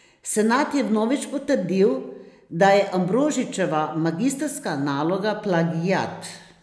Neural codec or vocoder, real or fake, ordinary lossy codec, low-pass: none; real; none; none